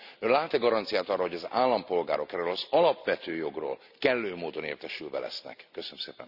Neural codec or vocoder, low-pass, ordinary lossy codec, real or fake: none; 5.4 kHz; none; real